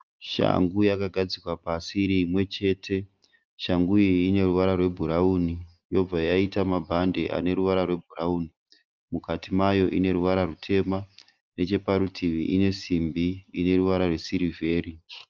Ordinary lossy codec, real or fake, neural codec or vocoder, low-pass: Opus, 24 kbps; real; none; 7.2 kHz